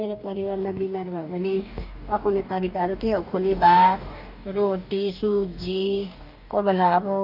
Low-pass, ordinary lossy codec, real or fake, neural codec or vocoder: 5.4 kHz; none; fake; codec, 44.1 kHz, 2.6 kbps, DAC